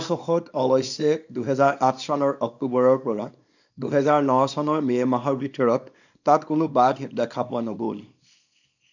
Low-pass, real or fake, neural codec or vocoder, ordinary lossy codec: 7.2 kHz; fake; codec, 24 kHz, 0.9 kbps, WavTokenizer, small release; none